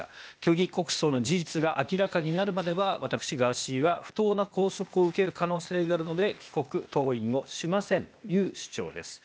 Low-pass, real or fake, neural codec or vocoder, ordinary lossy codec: none; fake; codec, 16 kHz, 0.8 kbps, ZipCodec; none